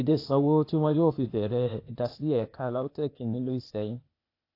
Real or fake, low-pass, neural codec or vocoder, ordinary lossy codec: fake; 5.4 kHz; codec, 16 kHz, 0.8 kbps, ZipCodec; AAC, 32 kbps